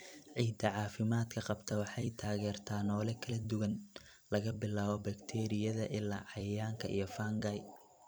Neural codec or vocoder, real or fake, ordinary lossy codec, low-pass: vocoder, 44.1 kHz, 128 mel bands every 256 samples, BigVGAN v2; fake; none; none